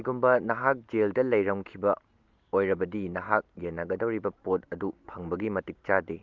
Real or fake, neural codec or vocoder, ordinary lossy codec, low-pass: real; none; Opus, 32 kbps; 7.2 kHz